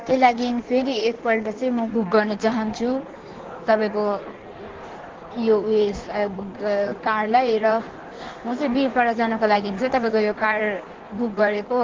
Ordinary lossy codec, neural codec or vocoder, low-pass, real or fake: Opus, 16 kbps; codec, 16 kHz in and 24 kHz out, 1.1 kbps, FireRedTTS-2 codec; 7.2 kHz; fake